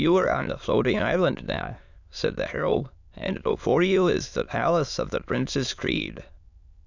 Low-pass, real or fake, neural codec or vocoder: 7.2 kHz; fake; autoencoder, 22.05 kHz, a latent of 192 numbers a frame, VITS, trained on many speakers